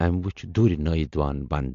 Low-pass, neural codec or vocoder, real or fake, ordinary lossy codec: 7.2 kHz; none; real; AAC, 64 kbps